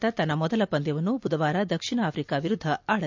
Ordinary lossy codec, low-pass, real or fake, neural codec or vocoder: MP3, 64 kbps; 7.2 kHz; fake; vocoder, 44.1 kHz, 128 mel bands every 256 samples, BigVGAN v2